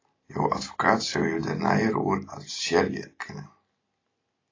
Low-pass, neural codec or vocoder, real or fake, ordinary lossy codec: 7.2 kHz; none; real; AAC, 32 kbps